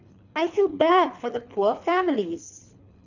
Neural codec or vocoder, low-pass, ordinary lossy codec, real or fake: codec, 24 kHz, 3 kbps, HILCodec; 7.2 kHz; none; fake